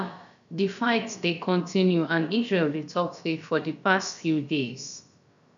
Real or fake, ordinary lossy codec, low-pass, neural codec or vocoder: fake; none; 7.2 kHz; codec, 16 kHz, about 1 kbps, DyCAST, with the encoder's durations